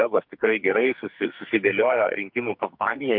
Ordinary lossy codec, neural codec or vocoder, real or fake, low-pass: MP3, 48 kbps; codec, 44.1 kHz, 2.6 kbps, SNAC; fake; 5.4 kHz